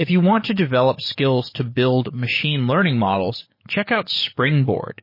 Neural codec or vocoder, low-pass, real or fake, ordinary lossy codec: codec, 44.1 kHz, 7.8 kbps, DAC; 5.4 kHz; fake; MP3, 24 kbps